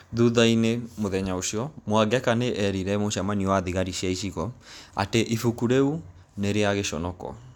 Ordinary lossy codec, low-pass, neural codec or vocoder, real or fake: none; 19.8 kHz; none; real